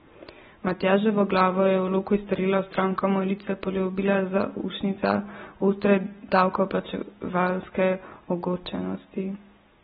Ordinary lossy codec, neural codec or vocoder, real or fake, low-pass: AAC, 16 kbps; none; real; 19.8 kHz